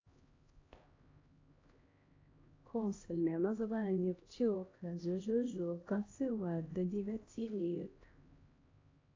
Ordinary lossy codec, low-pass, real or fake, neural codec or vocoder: AAC, 32 kbps; 7.2 kHz; fake; codec, 16 kHz, 1 kbps, X-Codec, HuBERT features, trained on LibriSpeech